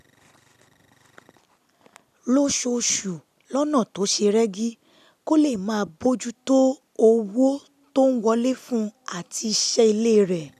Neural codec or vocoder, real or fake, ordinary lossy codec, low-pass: none; real; none; 14.4 kHz